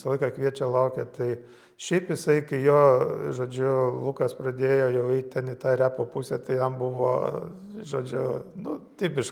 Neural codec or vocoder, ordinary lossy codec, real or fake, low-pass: none; Opus, 32 kbps; real; 19.8 kHz